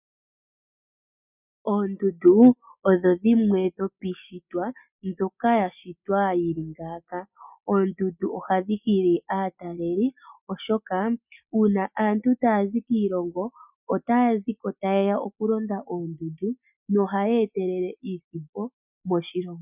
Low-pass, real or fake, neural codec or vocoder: 3.6 kHz; real; none